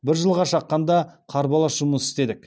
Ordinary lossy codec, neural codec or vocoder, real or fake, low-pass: none; none; real; none